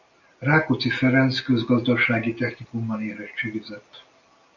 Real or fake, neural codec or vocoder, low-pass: real; none; 7.2 kHz